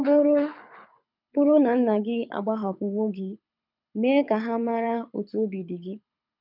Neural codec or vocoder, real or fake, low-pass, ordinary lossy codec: codec, 24 kHz, 6 kbps, HILCodec; fake; 5.4 kHz; none